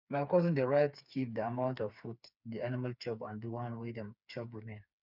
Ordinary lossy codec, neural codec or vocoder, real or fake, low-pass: none; codec, 16 kHz, 4 kbps, FreqCodec, smaller model; fake; 5.4 kHz